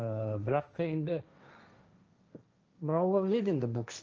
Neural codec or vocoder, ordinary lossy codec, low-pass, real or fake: codec, 16 kHz, 1.1 kbps, Voila-Tokenizer; Opus, 24 kbps; 7.2 kHz; fake